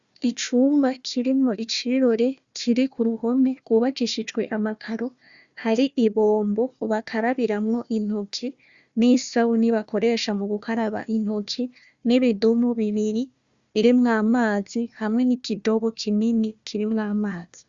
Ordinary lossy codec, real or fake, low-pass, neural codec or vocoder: Opus, 64 kbps; fake; 7.2 kHz; codec, 16 kHz, 1 kbps, FunCodec, trained on Chinese and English, 50 frames a second